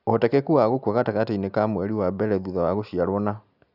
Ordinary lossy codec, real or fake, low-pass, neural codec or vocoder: none; real; 5.4 kHz; none